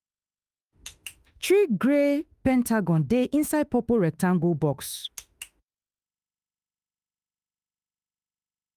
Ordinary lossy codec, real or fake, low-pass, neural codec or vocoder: Opus, 32 kbps; fake; 14.4 kHz; autoencoder, 48 kHz, 32 numbers a frame, DAC-VAE, trained on Japanese speech